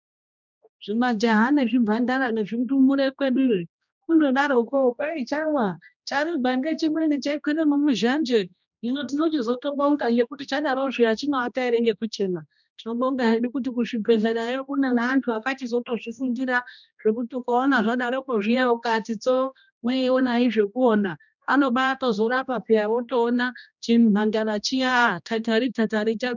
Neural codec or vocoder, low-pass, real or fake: codec, 16 kHz, 1 kbps, X-Codec, HuBERT features, trained on general audio; 7.2 kHz; fake